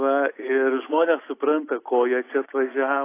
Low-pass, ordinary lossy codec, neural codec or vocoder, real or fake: 3.6 kHz; AAC, 24 kbps; none; real